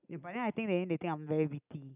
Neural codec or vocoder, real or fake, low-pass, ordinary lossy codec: none; real; 3.6 kHz; none